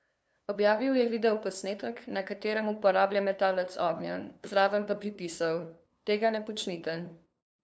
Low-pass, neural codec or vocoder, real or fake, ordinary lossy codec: none; codec, 16 kHz, 2 kbps, FunCodec, trained on LibriTTS, 25 frames a second; fake; none